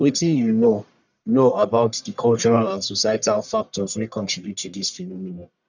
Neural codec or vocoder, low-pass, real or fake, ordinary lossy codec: codec, 44.1 kHz, 1.7 kbps, Pupu-Codec; 7.2 kHz; fake; none